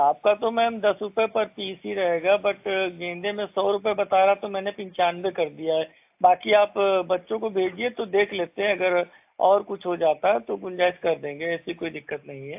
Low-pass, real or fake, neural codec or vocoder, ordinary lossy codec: 3.6 kHz; real; none; AAC, 32 kbps